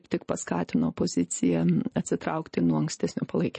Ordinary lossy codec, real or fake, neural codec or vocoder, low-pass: MP3, 32 kbps; real; none; 10.8 kHz